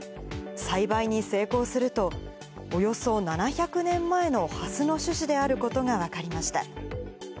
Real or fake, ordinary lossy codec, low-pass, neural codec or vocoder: real; none; none; none